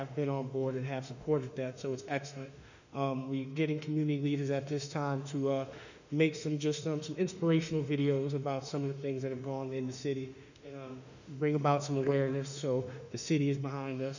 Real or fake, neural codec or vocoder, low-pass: fake; autoencoder, 48 kHz, 32 numbers a frame, DAC-VAE, trained on Japanese speech; 7.2 kHz